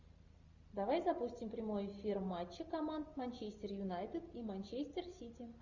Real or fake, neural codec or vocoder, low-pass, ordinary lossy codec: real; none; 7.2 kHz; Opus, 64 kbps